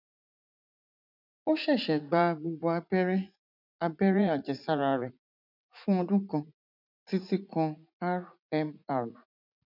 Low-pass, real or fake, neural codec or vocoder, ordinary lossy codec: 5.4 kHz; fake; vocoder, 44.1 kHz, 80 mel bands, Vocos; none